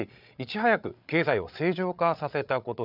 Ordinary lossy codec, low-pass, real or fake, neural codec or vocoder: none; 5.4 kHz; fake; codec, 16 kHz, 8 kbps, FreqCodec, larger model